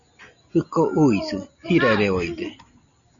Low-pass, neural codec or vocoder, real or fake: 7.2 kHz; none; real